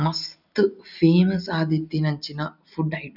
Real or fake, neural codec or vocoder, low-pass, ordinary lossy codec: real; none; 5.4 kHz; none